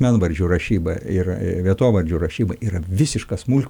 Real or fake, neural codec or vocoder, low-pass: real; none; 19.8 kHz